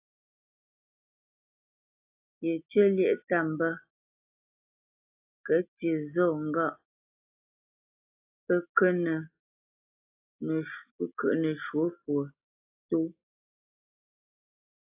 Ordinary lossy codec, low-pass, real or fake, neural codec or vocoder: AAC, 32 kbps; 3.6 kHz; real; none